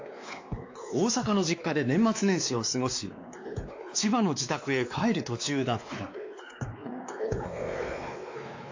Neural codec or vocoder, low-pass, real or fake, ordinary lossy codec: codec, 16 kHz, 2 kbps, X-Codec, WavLM features, trained on Multilingual LibriSpeech; 7.2 kHz; fake; AAC, 48 kbps